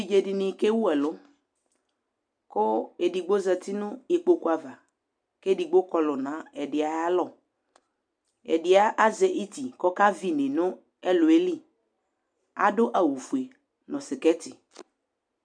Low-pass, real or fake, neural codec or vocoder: 9.9 kHz; real; none